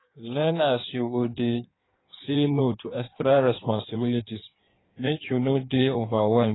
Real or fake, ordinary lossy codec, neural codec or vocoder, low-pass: fake; AAC, 16 kbps; codec, 16 kHz in and 24 kHz out, 1.1 kbps, FireRedTTS-2 codec; 7.2 kHz